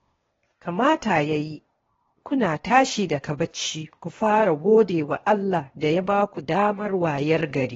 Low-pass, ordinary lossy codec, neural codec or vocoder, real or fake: 7.2 kHz; AAC, 24 kbps; codec, 16 kHz, 0.8 kbps, ZipCodec; fake